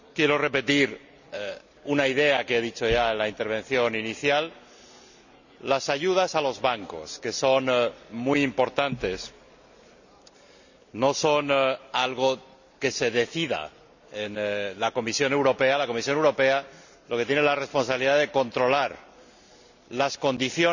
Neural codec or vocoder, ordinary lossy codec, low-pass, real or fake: none; none; 7.2 kHz; real